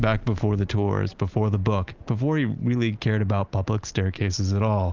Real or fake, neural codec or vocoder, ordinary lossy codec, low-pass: real; none; Opus, 32 kbps; 7.2 kHz